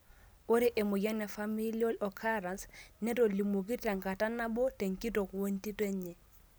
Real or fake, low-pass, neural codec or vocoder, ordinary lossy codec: real; none; none; none